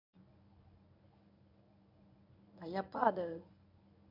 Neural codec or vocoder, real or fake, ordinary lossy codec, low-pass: codec, 24 kHz, 0.9 kbps, WavTokenizer, medium speech release version 1; fake; none; 5.4 kHz